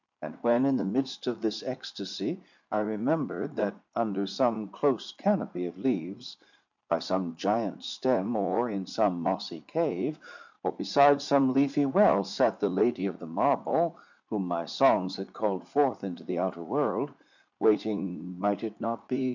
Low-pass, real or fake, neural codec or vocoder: 7.2 kHz; fake; vocoder, 44.1 kHz, 80 mel bands, Vocos